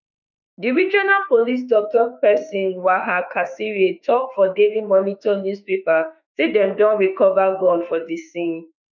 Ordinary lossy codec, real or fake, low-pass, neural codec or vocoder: none; fake; 7.2 kHz; autoencoder, 48 kHz, 32 numbers a frame, DAC-VAE, trained on Japanese speech